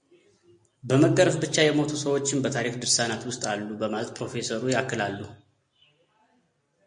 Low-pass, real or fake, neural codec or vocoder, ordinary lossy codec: 9.9 kHz; real; none; MP3, 48 kbps